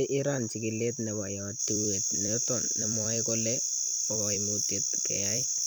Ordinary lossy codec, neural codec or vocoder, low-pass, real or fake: none; vocoder, 44.1 kHz, 128 mel bands every 256 samples, BigVGAN v2; none; fake